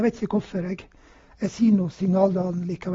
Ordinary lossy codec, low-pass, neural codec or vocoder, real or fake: AAC, 24 kbps; 7.2 kHz; none; real